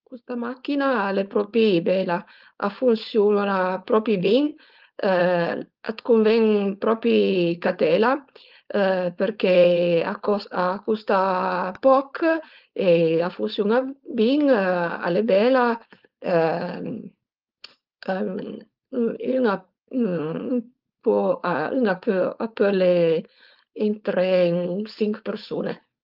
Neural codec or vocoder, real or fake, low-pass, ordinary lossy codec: codec, 16 kHz, 4.8 kbps, FACodec; fake; 5.4 kHz; Opus, 24 kbps